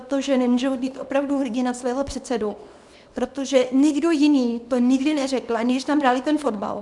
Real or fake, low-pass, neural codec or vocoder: fake; 10.8 kHz; codec, 24 kHz, 0.9 kbps, WavTokenizer, small release